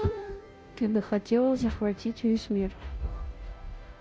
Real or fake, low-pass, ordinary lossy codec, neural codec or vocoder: fake; none; none; codec, 16 kHz, 0.5 kbps, FunCodec, trained on Chinese and English, 25 frames a second